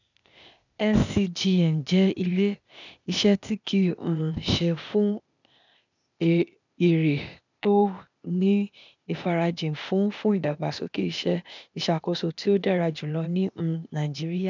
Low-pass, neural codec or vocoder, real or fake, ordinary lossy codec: 7.2 kHz; codec, 16 kHz, 0.8 kbps, ZipCodec; fake; none